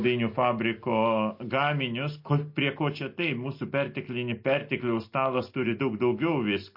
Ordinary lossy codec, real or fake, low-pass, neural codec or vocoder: MP3, 32 kbps; real; 5.4 kHz; none